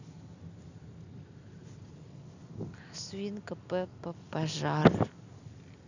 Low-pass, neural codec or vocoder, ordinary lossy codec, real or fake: 7.2 kHz; none; none; real